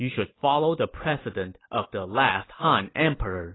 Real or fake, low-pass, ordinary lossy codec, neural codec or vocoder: real; 7.2 kHz; AAC, 16 kbps; none